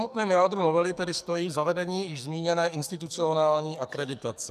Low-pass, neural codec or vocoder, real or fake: 14.4 kHz; codec, 44.1 kHz, 2.6 kbps, SNAC; fake